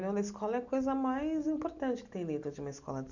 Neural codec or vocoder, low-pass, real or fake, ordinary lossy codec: none; 7.2 kHz; real; none